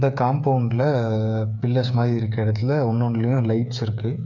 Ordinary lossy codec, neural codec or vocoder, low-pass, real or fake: none; codec, 16 kHz, 8 kbps, FreqCodec, smaller model; 7.2 kHz; fake